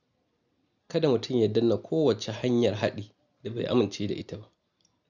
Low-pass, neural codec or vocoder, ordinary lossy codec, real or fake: 7.2 kHz; none; none; real